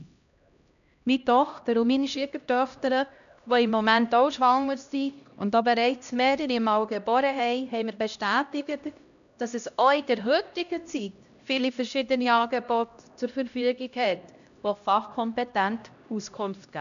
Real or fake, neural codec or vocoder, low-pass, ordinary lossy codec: fake; codec, 16 kHz, 1 kbps, X-Codec, HuBERT features, trained on LibriSpeech; 7.2 kHz; none